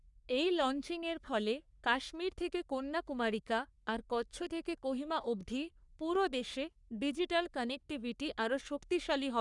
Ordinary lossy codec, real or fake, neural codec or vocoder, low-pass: none; fake; codec, 44.1 kHz, 3.4 kbps, Pupu-Codec; 10.8 kHz